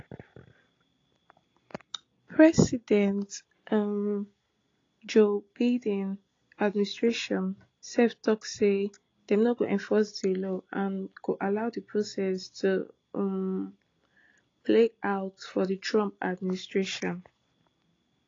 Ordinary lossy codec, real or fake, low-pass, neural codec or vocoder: AAC, 32 kbps; real; 7.2 kHz; none